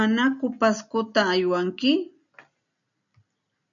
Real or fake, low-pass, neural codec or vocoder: real; 7.2 kHz; none